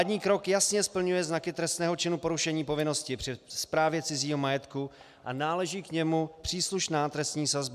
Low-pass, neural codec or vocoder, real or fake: 14.4 kHz; none; real